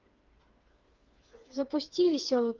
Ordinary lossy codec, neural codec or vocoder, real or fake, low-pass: Opus, 32 kbps; codec, 16 kHz, 4 kbps, FreqCodec, smaller model; fake; 7.2 kHz